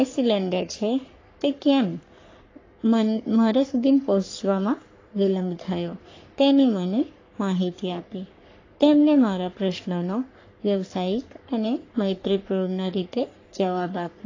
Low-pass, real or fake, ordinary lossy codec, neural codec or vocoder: 7.2 kHz; fake; AAC, 32 kbps; codec, 44.1 kHz, 3.4 kbps, Pupu-Codec